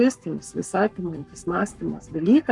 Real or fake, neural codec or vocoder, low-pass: fake; codec, 44.1 kHz, 7.8 kbps, Pupu-Codec; 10.8 kHz